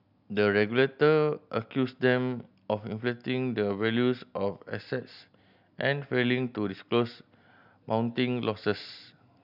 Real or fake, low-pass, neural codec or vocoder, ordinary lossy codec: real; 5.4 kHz; none; none